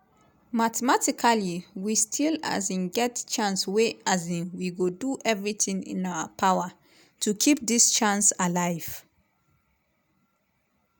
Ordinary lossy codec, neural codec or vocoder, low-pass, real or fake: none; none; none; real